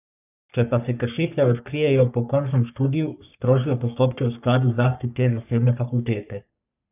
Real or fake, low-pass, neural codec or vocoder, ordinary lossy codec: fake; 3.6 kHz; codec, 44.1 kHz, 3.4 kbps, Pupu-Codec; none